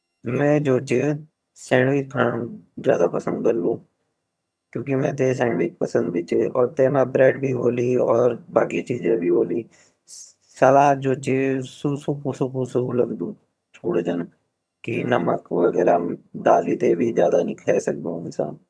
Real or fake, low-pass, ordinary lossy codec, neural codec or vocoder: fake; none; none; vocoder, 22.05 kHz, 80 mel bands, HiFi-GAN